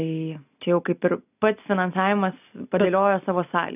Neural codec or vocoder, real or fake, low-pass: none; real; 3.6 kHz